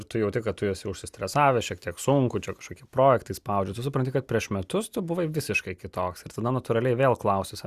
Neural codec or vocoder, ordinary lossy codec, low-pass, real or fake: none; Opus, 64 kbps; 14.4 kHz; real